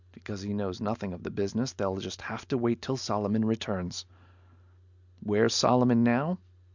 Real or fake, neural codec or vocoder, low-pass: real; none; 7.2 kHz